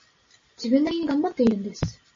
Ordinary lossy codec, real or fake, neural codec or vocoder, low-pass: MP3, 32 kbps; real; none; 7.2 kHz